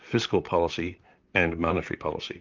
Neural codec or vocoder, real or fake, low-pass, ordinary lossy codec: vocoder, 44.1 kHz, 128 mel bands, Pupu-Vocoder; fake; 7.2 kHz; Opus, 24 kbps